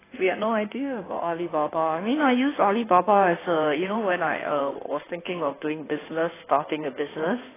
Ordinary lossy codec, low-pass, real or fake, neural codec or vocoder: AAC, 16 kbps; 3.6 kHz; fake; codec, 16 kHz in and 24 kHz out, 2.2 kbps, FireRedTTS-2 codec